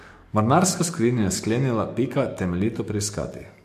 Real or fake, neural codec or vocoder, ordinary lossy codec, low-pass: fake; codec, 44.1 kHz, 7.8 kbps, DAC; MP3, 64 kbps; 14.4 kHz